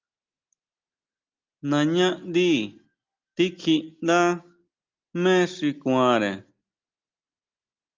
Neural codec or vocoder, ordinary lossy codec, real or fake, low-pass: none; Opus, 24 kbps; real; 7.2 kHz